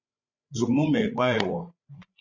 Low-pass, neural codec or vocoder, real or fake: 7.2 kHz; codec, 16 kHz, 16 kbps, FreqCodec, larger model; fake